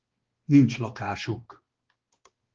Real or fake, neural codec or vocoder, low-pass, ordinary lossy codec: fake; codec, 16 kHz, 2 kbps, X-Codec, HuBERT features, trained on balanced general audio; 7.2 kHz; Opus, 16 kbps